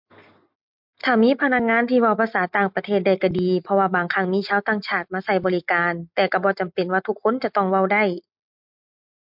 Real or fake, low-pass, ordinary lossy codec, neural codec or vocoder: real; 5.4 kHz; MP3, 48 kbps; none